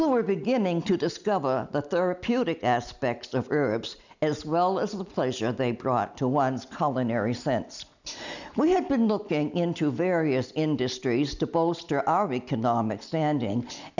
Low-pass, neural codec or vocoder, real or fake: 7.2 kHz; none; real